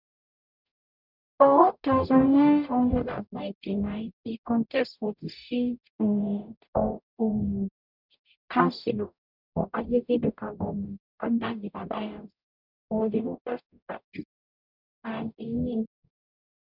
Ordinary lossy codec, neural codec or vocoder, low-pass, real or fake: none; codec, 44.1 kHz, 0.9 kbps, DAC; 5.4 kHz; fake